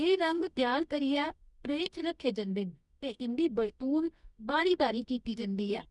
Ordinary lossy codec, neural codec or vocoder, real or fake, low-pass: none; codec, 24 kHz, 0.9 kbps, WavTokenizer, medium music audio release; fake; 10.8 kHz